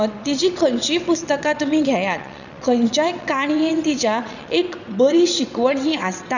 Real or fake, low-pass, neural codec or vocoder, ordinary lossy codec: fake; 7.2 kHz; vocoder, 22.05 kHz, 80 mel bands, WaveNeXt; none